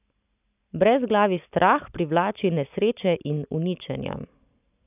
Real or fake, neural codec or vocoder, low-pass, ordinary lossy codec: real; none; 3.6 kHz; none